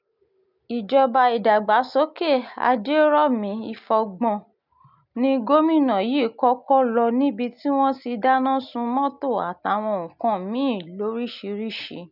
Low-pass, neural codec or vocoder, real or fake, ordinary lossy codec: 5.4 kHz; none; real; none